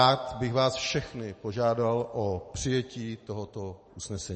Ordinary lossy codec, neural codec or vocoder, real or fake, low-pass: MP3, 32 kbps; none; real; 10.8 kHz